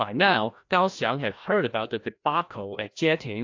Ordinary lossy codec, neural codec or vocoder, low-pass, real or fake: AAC, 48 kbps; codec, 16 kHz, 1 kbps, FreqCodec, larger model; 7.2 kHz; fake